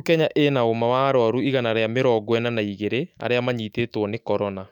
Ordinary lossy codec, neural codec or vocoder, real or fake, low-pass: none; autoencoder, 48 kHz, 128 numbers a frame, DAC-VAE, trained on Japanese speech; fake; 19.8 kHz